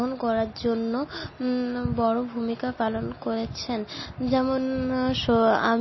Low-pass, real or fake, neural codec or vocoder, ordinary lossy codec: 7.2 kHz; real; none; MP3, 24 kbps